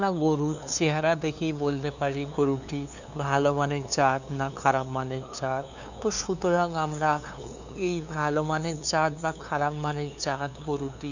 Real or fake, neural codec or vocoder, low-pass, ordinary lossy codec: fake; codec, 16 kHz, 2 kbps, FunCodec, trained on LibriTTS, 25 frames a second; 7.2 kHz; none